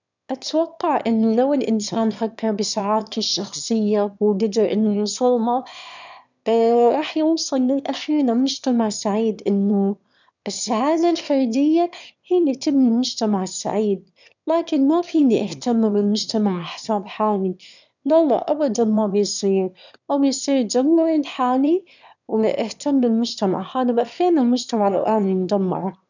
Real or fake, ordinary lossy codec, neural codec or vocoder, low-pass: fake; none; autoencoder, 22.05 kHz, a latent of 192 numbers a frame, VITS, trained on one speaker; 7.2 kHz